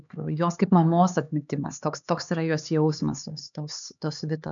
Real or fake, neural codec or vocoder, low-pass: fake; codec, 16 kHz, 4 kbps, X-Codec, HuBERT features, trained on LibriSpeech; 7.2 kHz